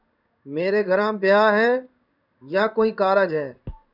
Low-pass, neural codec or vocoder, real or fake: 5.4 kHz; codec, 16 kHz in and 24 kHz out, 1 kbps, XY-Tokenizer; fake